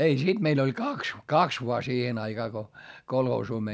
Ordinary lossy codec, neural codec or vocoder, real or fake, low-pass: none; none; real; none